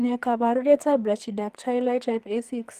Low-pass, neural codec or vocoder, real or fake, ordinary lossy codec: 14.4 kHz; codec, 44.1 kHz, 2.6 kbps, SNAC; fake; Opus, 24 kbps